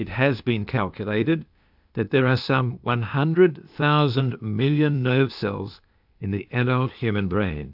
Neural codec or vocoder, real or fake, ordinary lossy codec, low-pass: codec, 16 kHz, 0.8 kbps, ZipCodec; fake; AAC, 48 kbps; 5.4 kHz